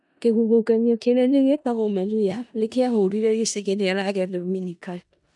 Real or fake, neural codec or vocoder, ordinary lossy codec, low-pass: fake; codec, 16 kHz in and 24 kHz out, 0.4 kbps, LongCat-Audio-Codec, four codebook decoder; none; 10.8 kHz